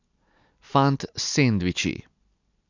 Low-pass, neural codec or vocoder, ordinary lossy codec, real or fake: 7.2 kHz; none; none; real